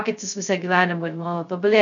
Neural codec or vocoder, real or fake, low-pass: codec, 16 kHz, 0.2 kbps, FocalCodec; fake; 7.2 kHz